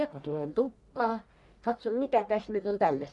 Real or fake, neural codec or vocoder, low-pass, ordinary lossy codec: fake; codec, 44.1 kHz, 1.7 kbps, Pupu-Codec; 10.8 kHz; none